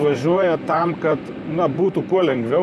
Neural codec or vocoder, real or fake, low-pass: vocoder, 44.1 kHz, 128 mel bands, Pupu-Vocoder; fake; 14.4 kHz